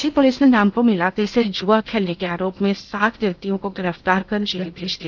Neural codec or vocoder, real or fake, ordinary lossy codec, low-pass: codec, 16 kHz in and 24 kHz out, 0.8 kbps, FocalCodec, streaming, 65536 codes; fake; none; 7.2 kHz